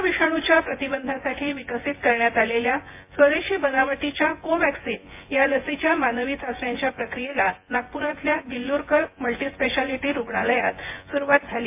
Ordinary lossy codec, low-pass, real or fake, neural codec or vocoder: MP3, 24 kbps; 3.6 kHz; fake; vocoder, 24 kHz, 100 mel bands, Vocos